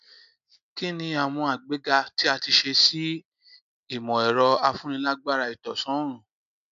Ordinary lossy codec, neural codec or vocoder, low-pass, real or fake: none; none; 7.2 kHz; real